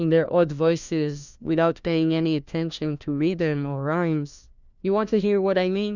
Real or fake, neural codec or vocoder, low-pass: fake; codec, 16 kHz, 1 kbps, FunCodec, trained on LibriTTS, 50 frames a second; 7.2 kHz